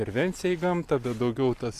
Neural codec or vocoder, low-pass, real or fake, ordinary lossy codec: none; 14.4 kHz; real; Opus, 64 kbps